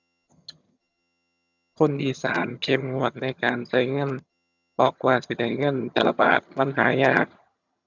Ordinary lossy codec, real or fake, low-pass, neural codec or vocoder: none; fake; 7.2 kHz; vocoder, 22.05 kHz, 80 mel bands, HiFi-GAN